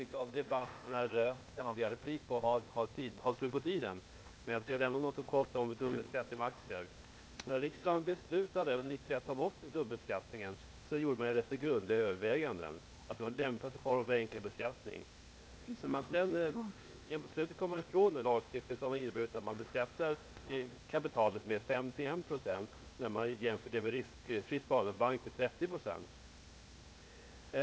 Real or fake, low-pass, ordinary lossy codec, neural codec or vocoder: fake; none; none; codec, 16 kHz, 0.8 kbps, ZipCodec